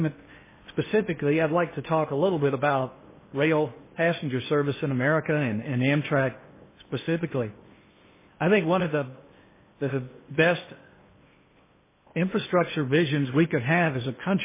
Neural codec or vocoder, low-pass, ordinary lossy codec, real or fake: codec, 16 kHz in and 24 kHz out, 0.8 kbps, FocalCodec, streaming, 65536 codes; 3.6 kHz; MP3, 16 kbps; fake